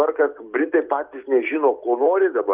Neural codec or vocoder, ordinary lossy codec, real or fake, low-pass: none; Opus, 32 kbps; real; 3.6 kHz